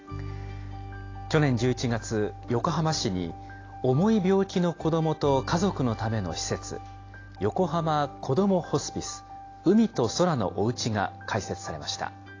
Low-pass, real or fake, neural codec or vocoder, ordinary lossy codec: 7.2 kHz; real; none; AAC, 32 kbps